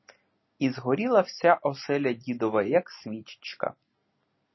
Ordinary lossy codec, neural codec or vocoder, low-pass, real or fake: MP3, 24 kbps; none; 7.2 kHz; real